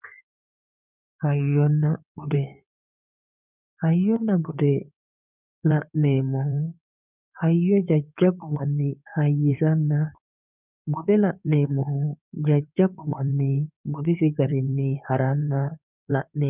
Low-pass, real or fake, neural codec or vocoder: 3.6 kHz; fake; codec, 16 kHz in and 24 kHz out, 2.2 kbps, FireRedTTS-2 codec